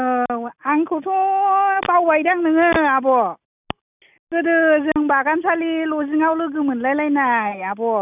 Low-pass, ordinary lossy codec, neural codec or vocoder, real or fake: 3.6 kHz; none; none; real